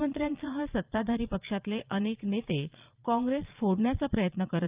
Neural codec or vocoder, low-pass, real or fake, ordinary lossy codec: vocoder, 22.05 kHz, 80 mel bands, Vocos; 3.6 kHz; fake; Opus, 24 kbps